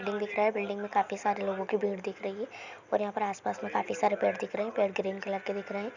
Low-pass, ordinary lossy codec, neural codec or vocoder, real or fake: 7.2 kHz; none; none; real